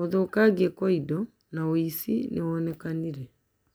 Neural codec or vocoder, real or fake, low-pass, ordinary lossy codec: none; real; none; none